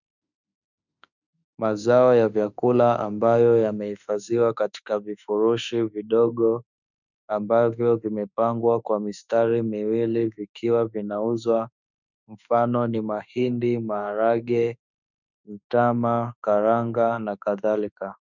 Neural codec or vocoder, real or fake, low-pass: autoencoder, 48 kHz, 32 numbers a frame, DAC-VAE, trained on Japanese speech; fake; 7.2 kHz